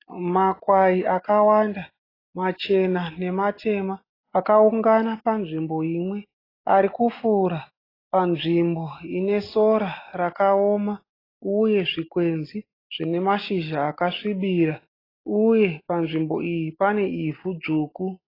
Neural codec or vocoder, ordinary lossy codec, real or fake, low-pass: none; AAC, 24 kbps; real; 5.4 kHz